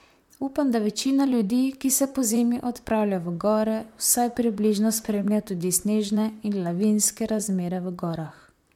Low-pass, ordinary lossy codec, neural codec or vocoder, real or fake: 19.8 kHz; MP3, 96 kbps; vocoder, 44.1 kHz, 128 mel bands, Pupu-Vocoder; fake